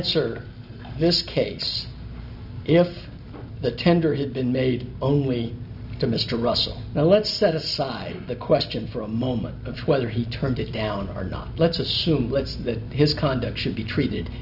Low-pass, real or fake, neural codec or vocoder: 5.4 kHz; real; none